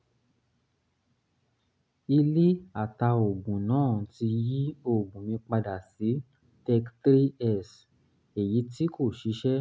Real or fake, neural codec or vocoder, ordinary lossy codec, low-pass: real; none; none; none